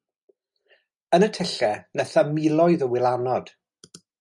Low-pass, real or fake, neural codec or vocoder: 10.8 kHz; real; none